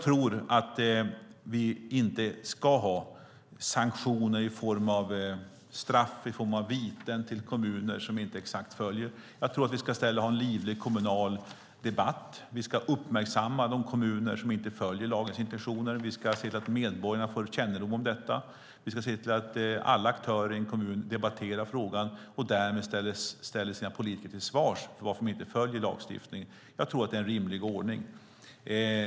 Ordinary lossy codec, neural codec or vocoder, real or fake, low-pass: none; none; real; none